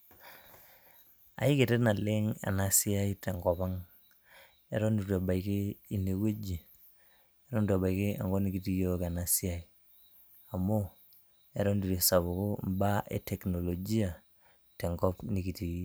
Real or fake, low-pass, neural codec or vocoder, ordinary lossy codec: real; none; none; none